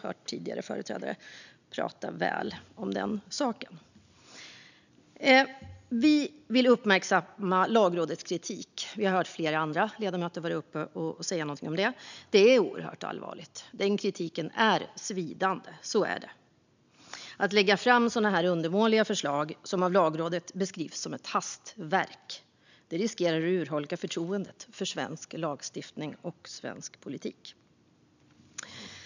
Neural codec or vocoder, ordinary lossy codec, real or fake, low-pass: none; none; real; 7.2 kHz